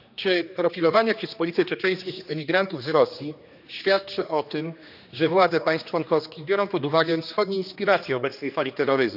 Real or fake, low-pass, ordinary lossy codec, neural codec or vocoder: fake; 5.4 kHz; none; codec, 16 kHz, 2 kbps, X-Codec, HuBERT features, trained on general audio